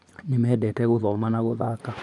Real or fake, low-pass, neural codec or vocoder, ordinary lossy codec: fake; 10.8 kHz; vocoder, 24 kHz, 100 mel bands, Vocos; none